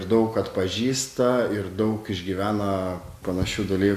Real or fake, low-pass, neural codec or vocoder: real; 14.4 kHz; none